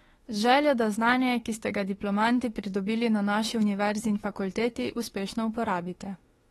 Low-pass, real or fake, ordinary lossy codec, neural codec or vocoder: 19.8 kHz; fake; AAC, 32 kbps; autoencoder, 48 kHz, 32 numbers a frame, DAC-VAE, trained on Japanese speech